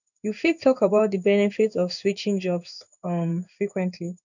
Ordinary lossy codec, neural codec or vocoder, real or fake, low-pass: none; codec, 16 kHz in and 24 kHz out, 1 kbps, XY-Tokenizer; fake; 7.2 kHz